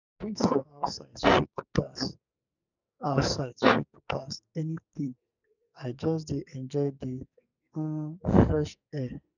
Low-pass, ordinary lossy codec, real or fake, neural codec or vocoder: 7.2 kHz; none; fake; codec, 44.1 kHz, 2.6 kbps, SNAC